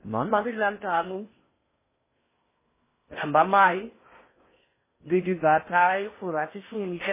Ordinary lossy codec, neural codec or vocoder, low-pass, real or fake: MP3, 16 kbps; codec, 16 kHz in and 24 kHz out, 0.6 kbps, FocalCodec, streaming, 4096 codes; 3.6 kHz; fake